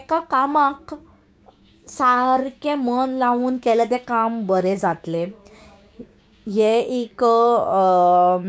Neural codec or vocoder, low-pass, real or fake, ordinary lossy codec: codec, 16 kHz, 6 kbps, DAC; none; fake; none